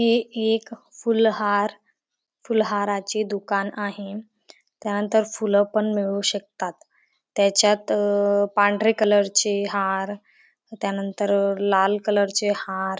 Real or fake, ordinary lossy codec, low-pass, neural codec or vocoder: real; none; none; none